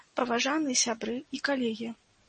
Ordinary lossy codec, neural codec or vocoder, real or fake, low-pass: MP3, 32 kbps; none; real; 10.8 kHz